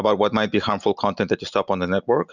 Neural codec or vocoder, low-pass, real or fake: none; 7.2 kHz; real